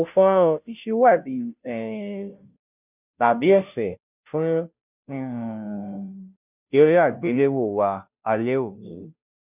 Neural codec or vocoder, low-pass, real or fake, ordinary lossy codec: codec, 16 kHz, 0.5 kbps, FunCodec, trained on Chinese and English, 25 frames a second; 3.6 kHz; fake; none